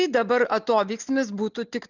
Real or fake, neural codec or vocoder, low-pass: real; none; 7.2 kHz